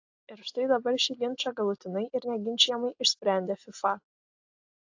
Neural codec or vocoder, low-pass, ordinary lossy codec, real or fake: none; 7.2 kHz; AAC, 48 kbps; real